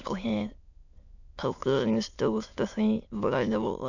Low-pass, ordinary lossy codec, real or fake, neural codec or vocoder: 7.2 kHz; none; fake; autoencoder, 22.05 kHz, a latent of 192 numbers a frame, VITS, trained on many speakers